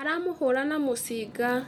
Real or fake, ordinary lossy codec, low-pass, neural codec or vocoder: real; none; 19.8 kHz; none